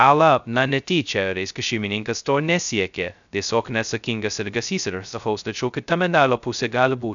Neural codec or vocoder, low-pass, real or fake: codec, 16 kHz, 0.2 kbps, FocalCodec; 7.2 kHz; fake